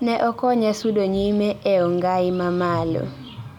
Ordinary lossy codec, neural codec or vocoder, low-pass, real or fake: none; none; 19.8 kHz; real